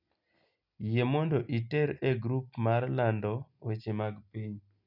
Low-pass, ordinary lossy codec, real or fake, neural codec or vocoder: 5.4 kHz; none; real; none